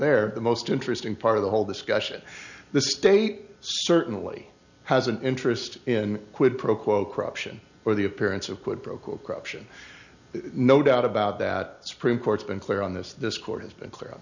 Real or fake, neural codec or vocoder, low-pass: real; none; 7.2 kHz